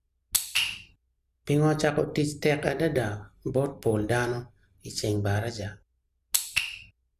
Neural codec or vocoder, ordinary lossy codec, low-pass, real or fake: none; none; 14.4 kHz; real